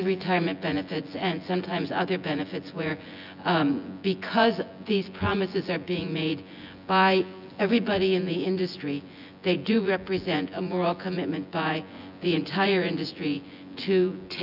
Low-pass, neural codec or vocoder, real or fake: 5.4 kHz; vocoder, 24 kHz, 100 mel bands, Vocos; fake